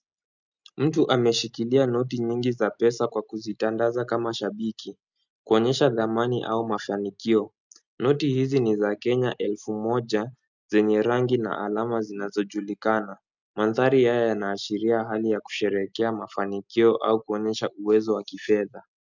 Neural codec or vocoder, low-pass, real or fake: none; 7.2 kHz; real